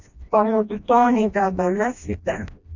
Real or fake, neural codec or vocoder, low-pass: fake; codec, 16 kHz, 1 kbps, FreqCodec, smaller model; 7.2 kHz